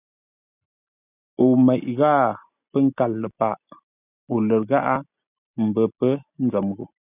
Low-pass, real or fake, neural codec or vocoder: 3.6 kHz; real; none